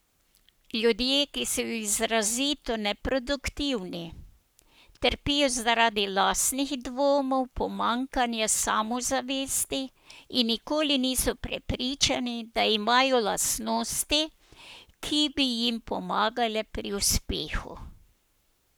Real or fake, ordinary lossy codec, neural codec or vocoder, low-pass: fake; none; codec, 44.1 kHz, 7.8 kbps, Pupu-Codec; none